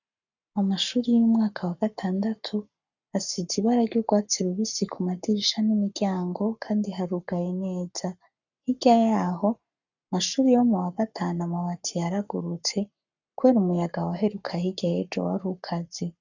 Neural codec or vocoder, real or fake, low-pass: codec, 44.1 kHz, 7.8 kbps, Pupu-Codec; fake; 7.2 kHz